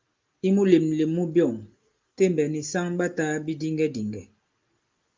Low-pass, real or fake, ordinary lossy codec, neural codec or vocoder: 7.2 kHz; real; Opus, 24 kbps; none